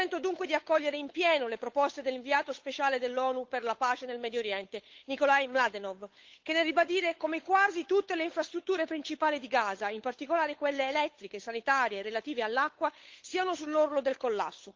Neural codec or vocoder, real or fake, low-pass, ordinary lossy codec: codec, 16 kHz, 8 kbps, FunCodec, trained on Chinese and English, 25 frames a second; fake; 7.2 kHz; Opus, 32 kbps